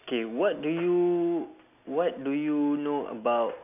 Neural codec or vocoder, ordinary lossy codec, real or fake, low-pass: none; none; real; 3.6 kHz